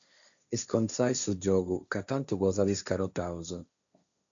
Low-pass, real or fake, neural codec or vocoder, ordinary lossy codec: 7.2 kHz; fake; codec, 16 kHz, 1.1 kbps, Voila-Tokenizer; MP3, 64 kbps